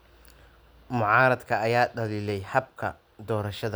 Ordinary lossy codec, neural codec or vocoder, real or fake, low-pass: none; none; real; none